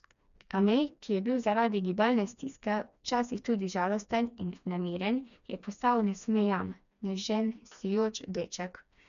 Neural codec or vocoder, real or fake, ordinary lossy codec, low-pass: codec, 16 kHz, 2 kbps, FreqCodec, smaller model; fake; none; 7.2 kHz